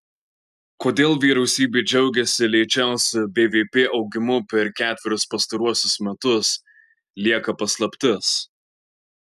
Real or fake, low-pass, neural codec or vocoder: real; 14.4 kHz; none